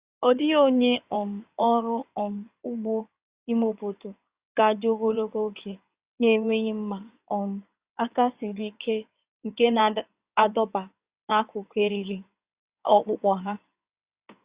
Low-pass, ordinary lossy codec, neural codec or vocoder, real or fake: 3.6 kHz; Opus, 64 kbps; codec, 16 kHz in and 24 kHz out, 2.2 kbps, FireRedTTS-2 codec; fake